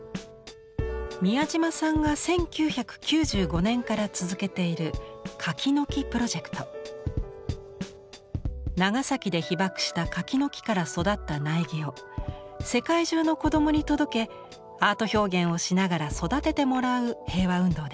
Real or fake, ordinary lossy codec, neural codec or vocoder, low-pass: real; none; none; none